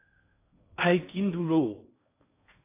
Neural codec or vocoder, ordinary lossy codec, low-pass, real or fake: codec, 16 kHz in and 24 kHz out, 0.8 kbps, FocalCodec, streaming, 65536 codes; AAC, 24 kbps; 3.6 kHz; fake